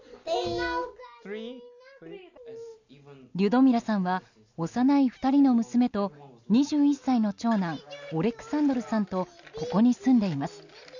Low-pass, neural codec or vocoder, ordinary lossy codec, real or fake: 7.2 kHz; none; none; real